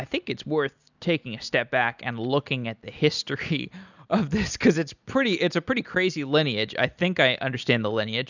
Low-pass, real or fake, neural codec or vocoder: 7.2 kHz; real; none